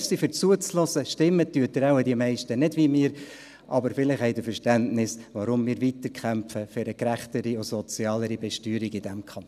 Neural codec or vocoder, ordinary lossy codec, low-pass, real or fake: none; none; 14.4 kHz; real